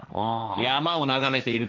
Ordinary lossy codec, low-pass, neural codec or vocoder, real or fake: none; none; codec, 16 kHz, 1.1 kbps, Voila-Tokenizer; fake